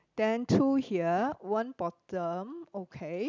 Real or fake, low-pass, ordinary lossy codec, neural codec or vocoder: real; 7.2 kHz; none; none